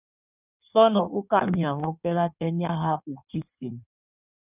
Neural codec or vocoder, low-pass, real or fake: codec, 16 kHz in and 24 kHz out, 1.1 kbps, FireRedTTS-2 codec; 3.6 kHz; fake